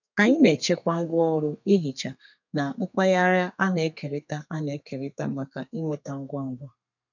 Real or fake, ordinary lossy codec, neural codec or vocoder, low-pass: fake; none; codec, 32 kHz, 1.9 kbps, SNAC; 7.2 kHz